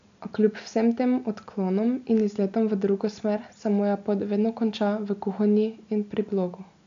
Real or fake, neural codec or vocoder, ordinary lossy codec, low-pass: real; none; AAC, 64 kbps; 7.2 kHz